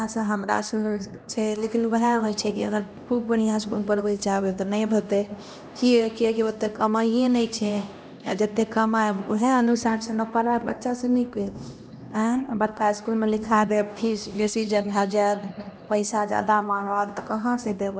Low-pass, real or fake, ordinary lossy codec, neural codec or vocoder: none; fake; none; codec, 16 kHz, 1 kbps, X-Codec, HuBERT features, trained on LibriSpeech